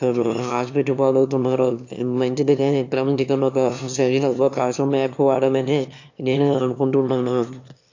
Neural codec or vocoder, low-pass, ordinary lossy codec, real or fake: autoencoder, 22.05 kHz, a latent of 192 numbers a frame, VITS, trained on one speaker; 7.2 kHz; AAC, 48 kbps; fake